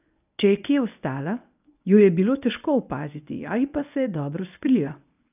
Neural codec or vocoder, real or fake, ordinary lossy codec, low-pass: codec, 24 kHz, 0.9 kbps, WavTokenizer, medium speech release version 1; fake; none; 3.6 kHz